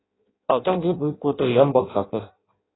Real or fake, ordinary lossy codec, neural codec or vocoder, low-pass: fake; AAC, 16 kbps; codec, 16 kHz in and 24 kHz out, 0.6 kbps, FireRedTTS-2 codec; 7.2 kHz